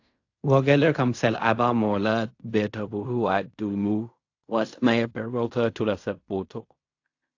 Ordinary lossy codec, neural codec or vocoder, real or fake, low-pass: AAC, 48 kbps; codec, 16 kHz in and 24 kHz out, 0.4 kbps, LongCat-Audio-Codec, fine tuned four codebook decoder; fake; 7.2 kHz